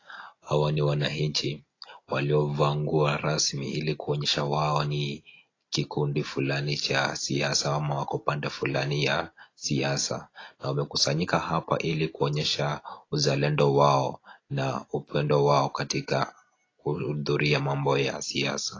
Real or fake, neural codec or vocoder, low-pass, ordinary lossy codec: real; none; 7.2 kHz; AAC, 32 kbps